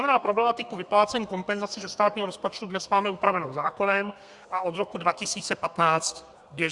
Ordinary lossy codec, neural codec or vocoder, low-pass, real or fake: Opus, 64 kbps; codec, 32 kHz, 1.9 kbps, SNAC; 10.8 kHz; fake